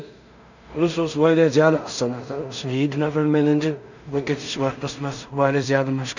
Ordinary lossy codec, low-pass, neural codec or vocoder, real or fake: none; 7.2 kHz; codec, 16 kHz in and 24 kHz out, 0.4 kbps, LongCat-Audio-Codec, two codebook decoder; fake